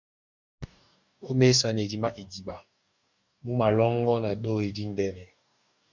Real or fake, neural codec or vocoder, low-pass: fake; codec, 44.1 kHz, 2.6 kbps, DAC; 7.2 kHz